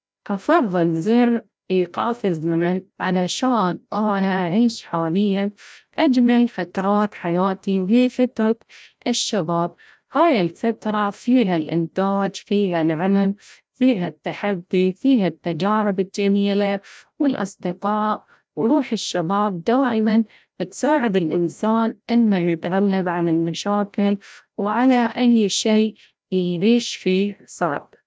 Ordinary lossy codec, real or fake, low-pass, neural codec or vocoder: none; fake; none; codec, 16 kHz, 0.5 kbps, FreqCodec, larger model